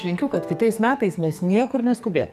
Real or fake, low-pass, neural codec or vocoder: fake; 14.4 kHz; codec, 44.1 kHz, 2.6 kbps, SNAC